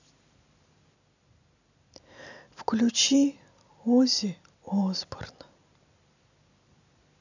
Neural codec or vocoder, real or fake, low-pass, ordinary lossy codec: none; real; 7.2 kHz; none